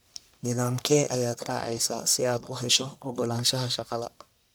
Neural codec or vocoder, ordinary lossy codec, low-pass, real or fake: codec, 44.1 kHz, 1.7 kbps, Pupu-Codec; none; none; fake